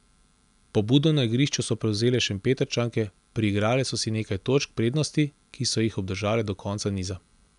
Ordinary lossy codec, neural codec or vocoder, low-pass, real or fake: none; none; 10.8 kHz; real